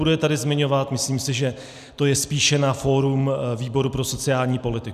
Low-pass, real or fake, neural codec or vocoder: 14.4 kHz; real; none